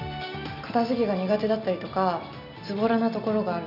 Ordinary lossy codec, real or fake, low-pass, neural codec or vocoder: AAC, 48 kbps; real; 5.4 kHz; none